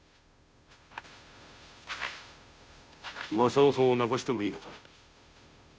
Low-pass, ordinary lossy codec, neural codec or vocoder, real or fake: none; none; codec, 16 kHz, 0.5 kbps, FunCodec, trained on Chinese and English, 25 frames a second; fake